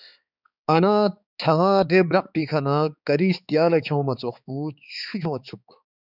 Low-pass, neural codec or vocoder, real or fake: 5.4 kHz; codec, 16 kHz, 4 kbps, X-Codec, HuBERT features, trained on balanced general audio; fake